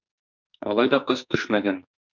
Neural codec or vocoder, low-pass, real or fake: codec, 44.1 kHz, 2.6 kbps, SNAC; 7.2 kHz; fake